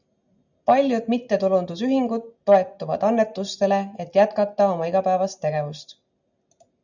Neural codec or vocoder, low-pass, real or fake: none; 7.2 kHz; real